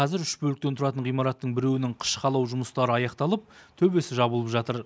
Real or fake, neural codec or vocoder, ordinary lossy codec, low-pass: real; none; none; none